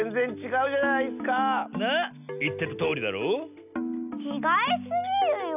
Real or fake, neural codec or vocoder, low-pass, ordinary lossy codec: real; none; 3.6 kHz; none